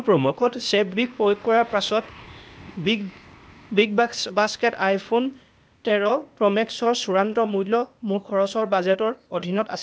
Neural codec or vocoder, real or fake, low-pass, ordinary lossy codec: codec, 16 kHz, 0.8 kbps, ZipCodec; fake; none; none